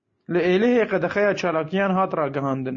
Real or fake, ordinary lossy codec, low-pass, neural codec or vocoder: real; MP3, 32 kbps; 7.2 kHz; none